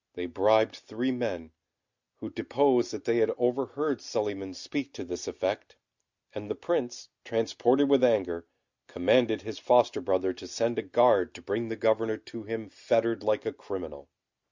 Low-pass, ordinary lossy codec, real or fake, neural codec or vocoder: 7.2 kHz; Opus, 64 kbps; real; none